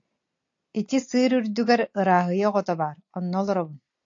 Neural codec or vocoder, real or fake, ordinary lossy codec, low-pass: none; real; AAC, 48 kbps; 7.2 kHz